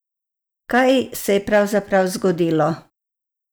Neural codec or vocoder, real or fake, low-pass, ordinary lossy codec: none; real; none; none